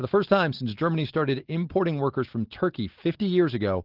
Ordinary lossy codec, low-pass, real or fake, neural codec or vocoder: Opus, 16 kbps; 5.4 kHz; real; none